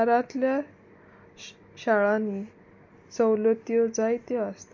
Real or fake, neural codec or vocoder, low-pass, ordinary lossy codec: real; none; 7.2 kHz; MP3, 48 kbps